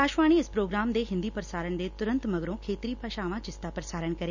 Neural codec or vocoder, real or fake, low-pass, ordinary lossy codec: none; real; 7.2 kHz; none